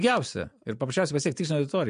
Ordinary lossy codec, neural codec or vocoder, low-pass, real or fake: MP3, 64 kbps; none; 9.9 kHz; real